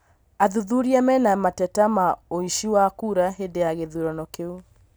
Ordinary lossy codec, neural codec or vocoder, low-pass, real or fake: none; none; none; real